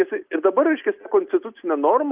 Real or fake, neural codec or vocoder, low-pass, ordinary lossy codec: real; none; 3.6 kHz; Opus, 24 kbps